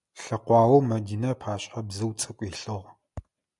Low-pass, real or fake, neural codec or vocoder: 10.8 kHz; real; none